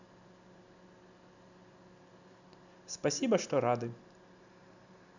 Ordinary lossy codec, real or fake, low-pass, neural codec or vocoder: none; real; 7.2 kHz; none